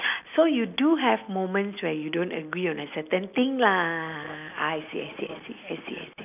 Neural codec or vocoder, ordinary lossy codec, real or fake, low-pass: none; none; real; 3.6 kHz